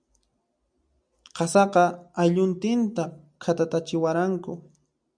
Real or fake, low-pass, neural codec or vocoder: real; 9.9 kHz; none